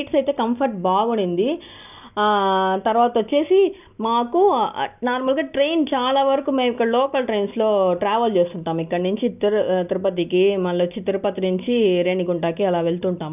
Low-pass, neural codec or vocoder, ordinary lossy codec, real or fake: 3.6 kHz; none; none; real